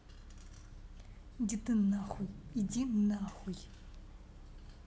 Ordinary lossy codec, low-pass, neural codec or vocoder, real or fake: none; none; none; real